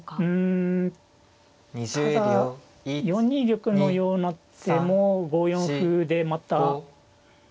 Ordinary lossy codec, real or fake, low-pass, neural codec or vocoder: none; real; none; none